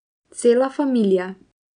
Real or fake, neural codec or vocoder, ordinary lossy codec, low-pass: real; none; none; 9.9 kHz